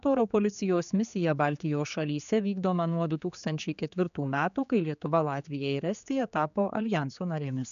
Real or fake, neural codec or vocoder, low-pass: fake; codec, 16 kHz, 4 kbps, X-Codec, HuBERT features, trained on general audio; 7.2 kHz